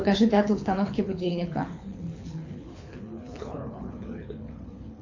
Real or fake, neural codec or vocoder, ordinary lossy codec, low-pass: fake; codec, 24 kHz, 6 kbps, HILCodec; AAC, 48 kbps; 7.2 kHz